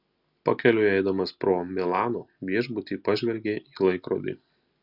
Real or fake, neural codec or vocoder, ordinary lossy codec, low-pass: real; none; Opus, 64 kbps; 5.4 kHz